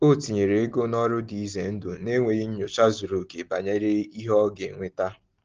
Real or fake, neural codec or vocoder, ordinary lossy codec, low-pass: real; none; Opus, 16 kbps; 7.2 kHz